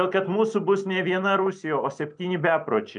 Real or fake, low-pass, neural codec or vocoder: real; 9.9 kHz; none